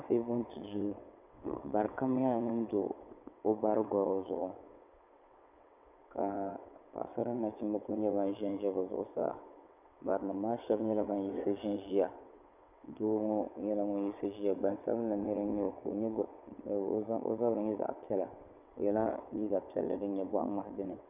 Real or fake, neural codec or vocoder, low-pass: fake; vocoder, 22.05 kHz, 80 mel bands, WaveNeXt; 3.6 kHz